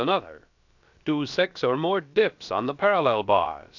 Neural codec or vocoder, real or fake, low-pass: codec, 16 kHz, about 1 kbps, DyCAST, with the encoder's durations; fake; 7.2 kHz